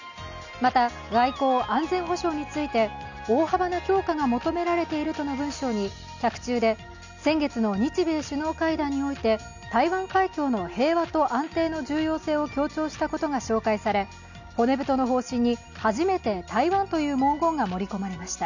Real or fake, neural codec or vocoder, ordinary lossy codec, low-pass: real; none; none; 7.2 kHz